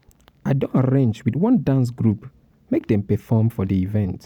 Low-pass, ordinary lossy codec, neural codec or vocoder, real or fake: 19.8 kHz; none; vocoder, 44.1 kHz, 128 mel bands every 512 samples, BigVGAN v2; fake